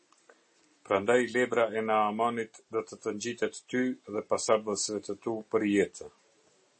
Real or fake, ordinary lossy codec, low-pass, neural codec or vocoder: real; MP3, 32 kbps; 10.8 kHz; none